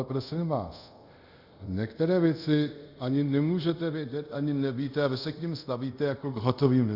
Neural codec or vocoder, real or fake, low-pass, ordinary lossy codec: codec, 24 kHz, 0.5 kbps, DualCodec; fake; 5.4 kHz; Opus, 64 kbps